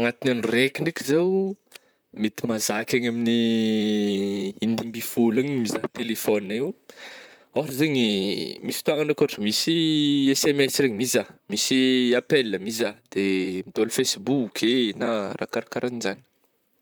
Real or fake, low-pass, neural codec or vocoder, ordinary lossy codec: fake; none; vocoder, 44.1 kHz, 128 mel bands, Pupu-Vocoder; none